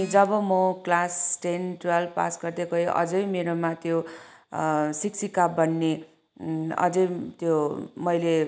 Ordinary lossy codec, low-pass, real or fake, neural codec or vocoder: none; none; real; none